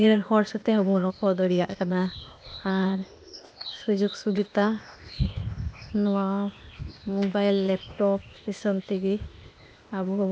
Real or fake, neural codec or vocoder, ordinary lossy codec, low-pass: fake; codec, 16 kHz, 0.8 kbps, ZipCodec; none; none